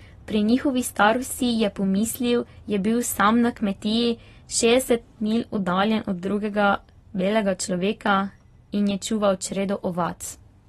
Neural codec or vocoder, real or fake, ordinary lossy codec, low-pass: none; real; AAC, 32 kbps; 14.4 kHz